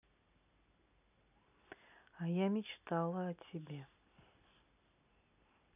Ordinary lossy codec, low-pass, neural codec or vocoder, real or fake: none; 3.6 kHz; none; real